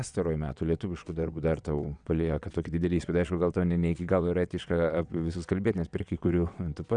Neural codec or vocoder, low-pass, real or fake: vocoder, 22.05 kHz, 80 mel bands, WaveNeXt; 9.9 kHz; fake